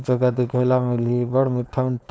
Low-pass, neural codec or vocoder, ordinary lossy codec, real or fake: none; codec, 16 kHz, 4.8 kbps, FACodec; none; fake